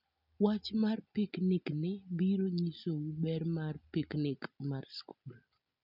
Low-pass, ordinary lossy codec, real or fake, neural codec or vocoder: 5.4 kHz; none; real; none